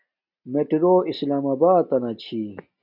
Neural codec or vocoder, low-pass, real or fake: none; 5.4 kHz; real